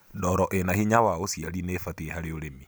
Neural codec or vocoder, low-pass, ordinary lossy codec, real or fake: none; none; none; real